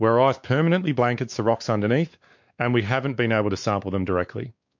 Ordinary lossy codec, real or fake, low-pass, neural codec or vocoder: MP3, 48 kbps; fake; 7.2 kHz; codec, 16 kHz, 4 kbps, X-Codec, WavLM features, trained on Multilingual LibriSpeech